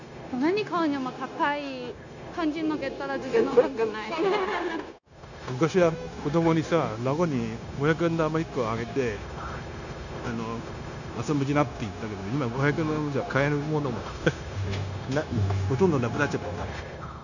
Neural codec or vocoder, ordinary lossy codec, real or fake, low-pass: codec, 16 kHz, 0.9 kbps, LongCat-Audio-Codec; none; fake; 7.2 kHz